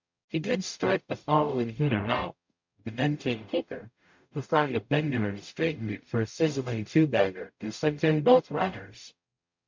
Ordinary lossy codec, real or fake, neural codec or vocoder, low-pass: MP3, 64 kbps; fake; codec, 44.1 kHz, 0.9 kbps, DAC; 7.2 kHz